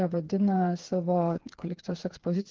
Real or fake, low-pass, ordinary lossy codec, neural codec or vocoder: fake; 7.2 kHz; Opus, 16 kbps; codec, 16 kHz, 4 kbps, FreqCodec, smaller model